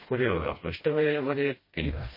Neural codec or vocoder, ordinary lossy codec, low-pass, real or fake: codec, 16 kHz, 1 kbps, FreqCodec, smaller model; MP3, 24 kbps; 5.4 kHz; fake